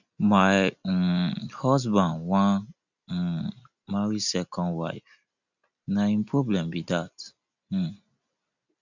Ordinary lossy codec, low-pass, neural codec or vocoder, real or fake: none; 7.2 kHz; none; real